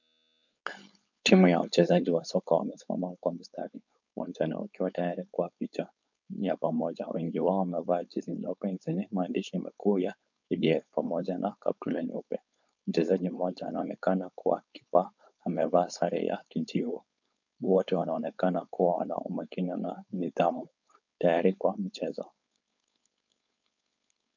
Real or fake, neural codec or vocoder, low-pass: fake; codec, 16 kHz, 4.8 kbps, FACodec; 7.2 kHz